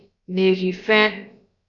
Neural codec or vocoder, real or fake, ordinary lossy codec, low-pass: codec, 16 kHz, about 1 kbps, DyCAST, with the encoder's durations; fake; MP3, 96 kbps; 7.2 kHz